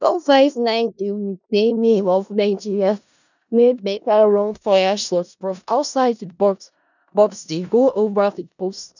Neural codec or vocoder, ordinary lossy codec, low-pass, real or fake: codec, 16 kHz in and 24 kHz out, 0.4 kbps, LongCat-Audio-Codec, four codebook decoder; none; 7.2 kHz; fake